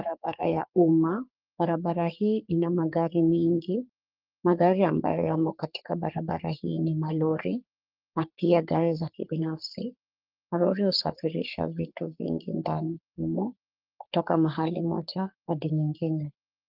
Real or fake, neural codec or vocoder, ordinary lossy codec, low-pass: fake; codec, 44.1 kHz, 3.4 kbps, Pupu-Codec; Opus, 24 kbps; 5.4 kHz